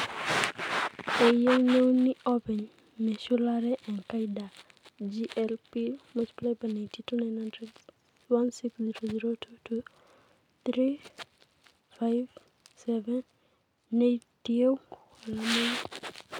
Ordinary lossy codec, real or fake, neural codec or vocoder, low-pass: none; real; none; 19.8 kHz